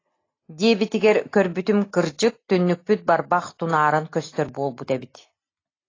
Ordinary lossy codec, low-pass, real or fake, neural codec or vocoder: AAC, 32 kbps; 7.2 kHz; real; none